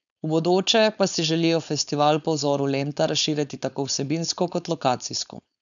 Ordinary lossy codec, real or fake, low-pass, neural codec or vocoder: none; fake; 7.2 kHz; codec, 16 kHz, 4.8 kbps, FACodec